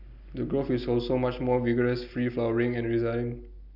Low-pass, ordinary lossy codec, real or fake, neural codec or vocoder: 5.4 kHz; none; real; none